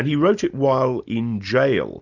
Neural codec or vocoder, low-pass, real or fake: none; 7.2 kHz; real